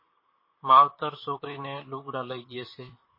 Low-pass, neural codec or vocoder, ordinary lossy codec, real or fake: 5.4 kHz; vocoder, 44.1 kHz, 128 mel bands, Pupu-Vocoder; MP3, 24 kbps; fake